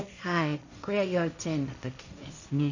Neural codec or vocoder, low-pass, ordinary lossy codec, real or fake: codec, 16 kHz, 1.1 kbps, Voila-Tokenizer; 7.2 kHz; none; fake